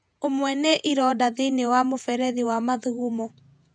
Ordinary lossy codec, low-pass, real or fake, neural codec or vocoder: none; 9.9 kHz; fake; vocoder, 48 kHz, 128 mel bands, Vocos